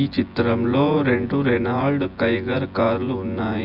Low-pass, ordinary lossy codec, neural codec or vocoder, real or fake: 5.4 kHz; AAC, 48 kbps; vocoder, 24 kHz, 100 mel bands, Vocos; fake